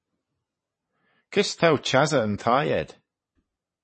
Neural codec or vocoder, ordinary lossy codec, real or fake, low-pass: vocoder, 22.05 kHz, 80 mel bands, WaveNeXt; MP3, 32 kbps; fake; 9.9 kHz